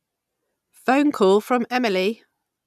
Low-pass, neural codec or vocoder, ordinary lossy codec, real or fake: 14.4 kHz; none; none; real